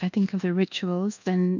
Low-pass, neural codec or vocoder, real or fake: 7.2 kHz; codec, 24 kHz, 1.2 kbps, DualCodec; fake